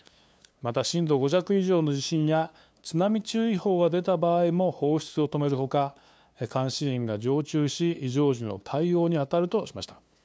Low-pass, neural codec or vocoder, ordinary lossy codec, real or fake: none; codec, 16 kHz, 2 kbps, FunCodec, trained on LibriTTS, 25 frames a second; none; fake